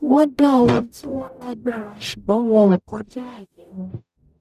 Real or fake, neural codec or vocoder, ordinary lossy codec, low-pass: fake; codec, 44.1 kHz, 0.9 kbps, DAC; none; 14.4 kHz